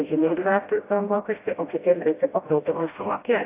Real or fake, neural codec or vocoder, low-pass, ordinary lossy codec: fake; codec, 16 kHz, 0.5 kbps, FreqCodec, smaller model; 3.6 kHz; AAC, 24 kbps